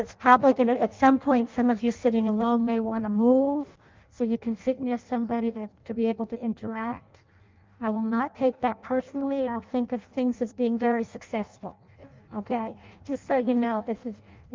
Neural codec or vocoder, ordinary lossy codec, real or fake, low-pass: codec, 16 kHz in and 24 kHz out, 0.6 kbps, FireRedTTS-2 codec; Opus, 24 kbps; fake; 7.2 kHz